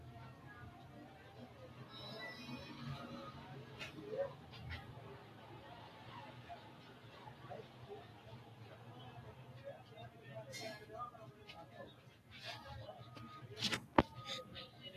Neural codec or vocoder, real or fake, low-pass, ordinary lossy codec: none; real; 14.4 kHz; AAC, 48 kbps